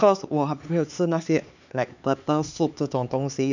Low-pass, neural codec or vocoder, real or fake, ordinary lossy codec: 7.2 kHz; codec, 16 kHz, 4 kbps, X-Codec, HuBERT features, trained on LibriSpeech; fake; none